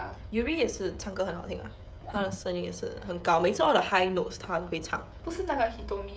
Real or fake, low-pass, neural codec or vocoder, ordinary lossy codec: fake; none; codec, 16 kHz, 16 kbps, FreqCodec, smaller model; none